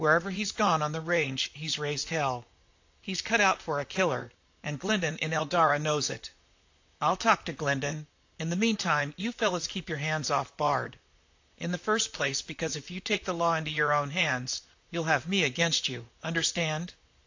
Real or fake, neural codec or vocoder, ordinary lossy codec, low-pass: fake; vocoder, 44.1 kHz, 128 mel bands, Pupu-Vocoder; AAC, 48 kbps; 7.2 kHz